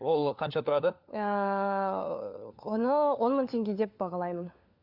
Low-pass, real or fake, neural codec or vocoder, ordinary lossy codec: 5.4 kHz; fake; codec, 16 kHz, 4 kbps, FunCodec, trained on LibriTTS, 50 frames a second; Opus, 64 kbps